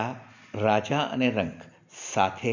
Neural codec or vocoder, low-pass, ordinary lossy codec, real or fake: none; 7.2 kHz; none; real